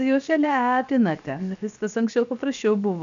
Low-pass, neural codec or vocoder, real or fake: 7.2 kHz; codec, 16 kHz, 0.7 kbps, FocalCodec; fake